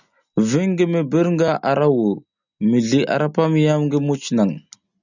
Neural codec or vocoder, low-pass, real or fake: none; 7.2 kHz; real